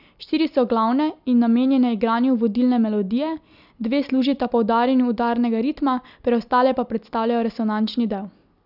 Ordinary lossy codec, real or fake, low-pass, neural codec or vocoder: none; real; 5.4 kHz; none